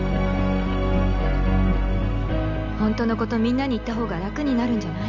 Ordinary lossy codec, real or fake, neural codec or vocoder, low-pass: none; real; none; 7.2 kHz